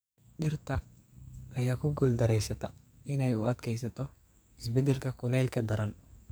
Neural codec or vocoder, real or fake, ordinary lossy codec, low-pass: codec, 44.1 kHz, 2.6 kbps, SNAC; fake; none; none